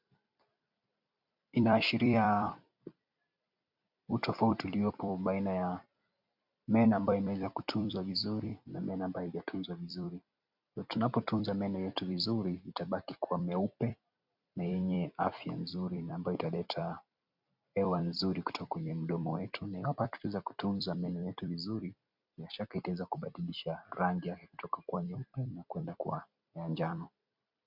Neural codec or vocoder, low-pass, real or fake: vocoder, 44.1 kHz, 128 mel bands every 256 samples, BigVGAN v2; 5.4 kHz; fake